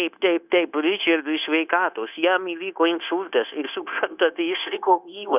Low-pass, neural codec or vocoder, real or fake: 3.6 kHz; codec, 16 kHz, 0.9 kbps, LongCat-Audio-Codec; fake